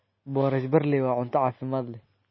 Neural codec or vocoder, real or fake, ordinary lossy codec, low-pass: none; real; MP3, 24 kbps; 7.2 kHz